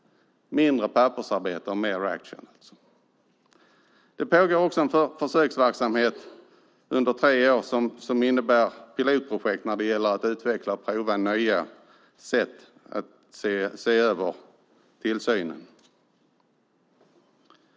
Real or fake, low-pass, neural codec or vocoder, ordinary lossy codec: real; none; none; none